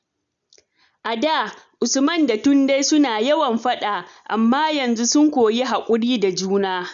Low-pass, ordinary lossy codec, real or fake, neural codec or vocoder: 7.2 kHz; none; real; none